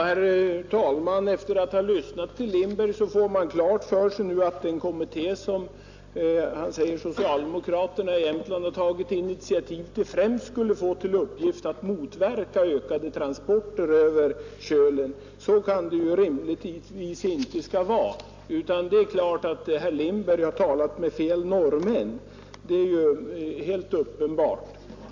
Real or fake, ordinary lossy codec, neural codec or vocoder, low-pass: real; AAC, 48 kbps; none; 7.2 kHz